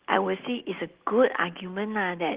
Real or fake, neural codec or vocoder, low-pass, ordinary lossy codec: real; none; 3.6 kHz; Opus, 16 kbps